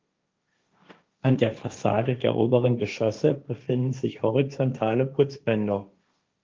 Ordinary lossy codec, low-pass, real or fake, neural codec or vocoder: Opus, 24 kbps; 7.2 kHz; fake; codec, 16 kHz, 1.1 kbps, Voila-Tokenizer